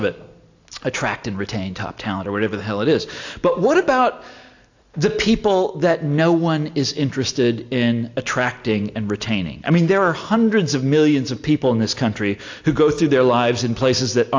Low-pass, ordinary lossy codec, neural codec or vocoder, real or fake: 7.2 kHz; AAC, 48 kbps; none; real